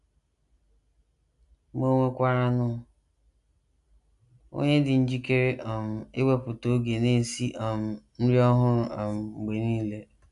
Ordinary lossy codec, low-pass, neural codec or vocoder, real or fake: none; 10.8 kHz; none; real